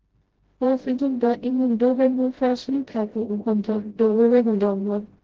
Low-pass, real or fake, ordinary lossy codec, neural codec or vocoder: 7.2 kHz; fake; Opus, 16 kbps; codec, 16 kHz, 0.5 kbps, FreqCodec, smaller model